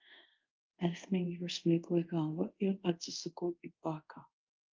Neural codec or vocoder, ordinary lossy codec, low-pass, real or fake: codec, 24 kHz, 0.5 kbps, DualCodec; Opus, 32 kbps; 7.2 kHz; fake